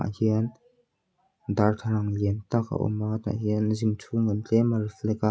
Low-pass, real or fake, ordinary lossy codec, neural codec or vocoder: none; real; none; none